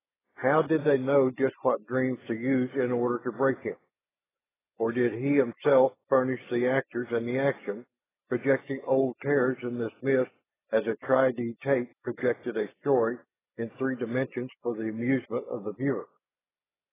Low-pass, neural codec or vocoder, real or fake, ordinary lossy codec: 3.6 kHz; none; real; AAC, 16 kbps